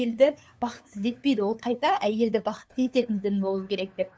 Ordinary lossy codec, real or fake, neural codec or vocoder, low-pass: none; fake; codec, 16 kHz, 2 kbps, FunCodec, trained on LibriTTS, 25 frames a second; none